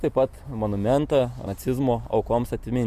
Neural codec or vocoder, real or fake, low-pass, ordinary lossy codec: none; real; 14.4 kHz; Opus, 64 kbps